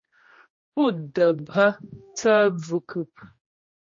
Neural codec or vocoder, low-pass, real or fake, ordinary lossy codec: codec, 16 kHz, 1 kbps, X-Codec, HuBERT features, trained on general audio; 7.2 kHz; fake; MP3, 32 kbps